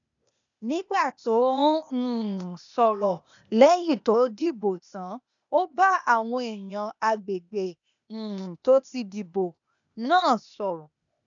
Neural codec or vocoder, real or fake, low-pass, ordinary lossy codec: codec, 16 kHz, 0.8 kbps, ZipCodec; fake; 7.2 kHz; none